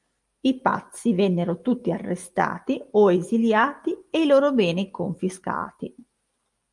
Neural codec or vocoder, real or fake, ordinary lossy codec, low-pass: none; real; Opus, 32 kbps; 10.8 kHz